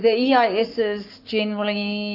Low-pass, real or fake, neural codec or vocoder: 5.4 kHz; fake; codec, 44.1 kHz, 7.8 kbps, Pupu-Codec